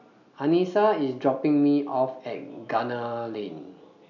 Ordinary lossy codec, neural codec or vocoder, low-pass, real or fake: none; none; 7.2 kHz; real